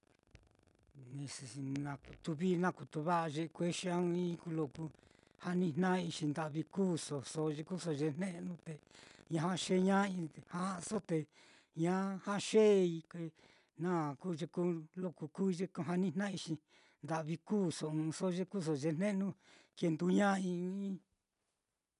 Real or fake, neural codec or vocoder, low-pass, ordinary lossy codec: real; none; 10.8 kHz; none